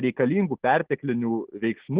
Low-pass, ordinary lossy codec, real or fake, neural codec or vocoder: 3.6 kHz; Opus, 16 kbps; real; none